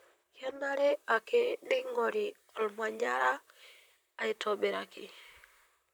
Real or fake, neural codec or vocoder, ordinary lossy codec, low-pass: fake; vocoder, 44.1 kHz, 128 mel bands, Pupu-Vocoder; none; none